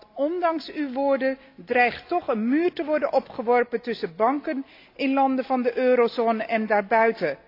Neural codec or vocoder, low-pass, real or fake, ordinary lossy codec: none; 5.4 kHz; real; AAC, 32 kbps